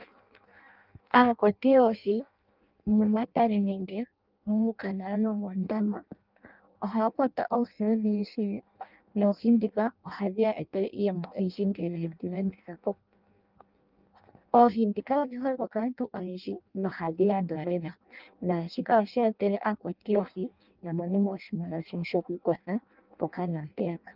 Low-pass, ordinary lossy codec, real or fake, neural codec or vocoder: 5.4 kHz; Opus, 24 kbps; fake; codec, 16 kHz in and 24 kHz out, 0.6 kbps, FireRedTTS-2 codec